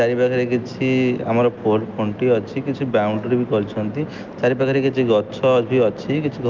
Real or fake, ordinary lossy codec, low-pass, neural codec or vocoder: real; Opus, 24 kbps; 7.2 kHz; none